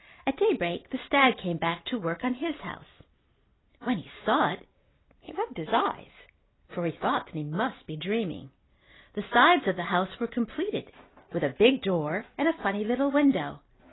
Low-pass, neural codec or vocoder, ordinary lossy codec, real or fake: 7.2 kHz; none; AAC, 16 kbps; real